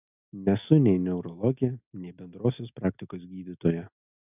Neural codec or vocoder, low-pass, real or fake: none; 3.6 kHz; real